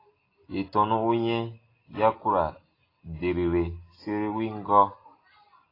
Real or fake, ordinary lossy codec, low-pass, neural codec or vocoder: real; AAC, 24 kbps; 5.4 kHz; none